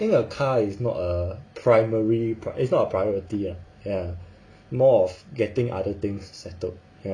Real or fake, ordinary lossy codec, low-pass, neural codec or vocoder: real; AAC, 48 kbps; 9.9 kHz; none